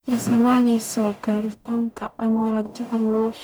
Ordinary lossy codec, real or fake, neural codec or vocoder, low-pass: none; fake; codec, 44.1 kHz, 0.9 kbps, DAC; none